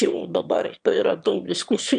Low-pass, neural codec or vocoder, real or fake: 9.9 kHz; autoencoder, 22.05 kHz, a latent of 192 numbers a frame, VITS, trained on one speaker; fake